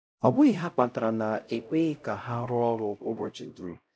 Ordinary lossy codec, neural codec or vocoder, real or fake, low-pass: none; codec, 16 kHz, 0.5 kbps, X-Codec, HuBERT features, trained on LibriSpeech; fake; none